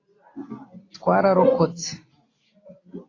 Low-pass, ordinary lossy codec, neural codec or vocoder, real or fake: 7.2 kHz; AAC, 32 kbps; none; real